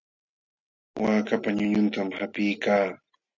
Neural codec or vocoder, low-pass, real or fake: none; 7.2 kHz; real